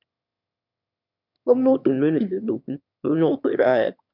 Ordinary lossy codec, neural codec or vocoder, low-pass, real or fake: none; autoencoder, 22.05 kHz, a latent of 192 numbers a frame, VITS, trained on one speaker; 5.4 kHz; fake